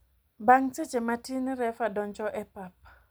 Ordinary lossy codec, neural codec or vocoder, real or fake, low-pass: none; none; real; none